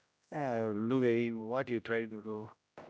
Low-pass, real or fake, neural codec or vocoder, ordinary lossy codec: none; fake; codec, 16 kHz, 0.5 kbps, X-Codec, HuBERT features, trained on general audio; none